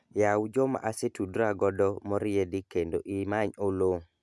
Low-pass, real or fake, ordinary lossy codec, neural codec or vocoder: none; real; none; none